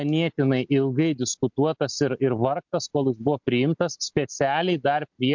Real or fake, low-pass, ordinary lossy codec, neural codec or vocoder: real; 7.2 kHz; MP3, 64 kbps; none